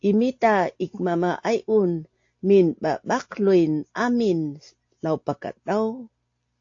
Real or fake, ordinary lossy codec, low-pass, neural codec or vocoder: real; AAC, 48 kbps; 7.2 kHz; none